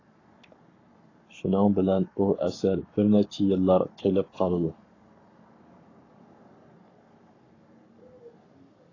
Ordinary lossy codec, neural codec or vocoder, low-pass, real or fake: AAC, 32 kbps; codec, 44.1 kHz, 7.8 kbps, Pupu-Codec; 7.2 kHz; fake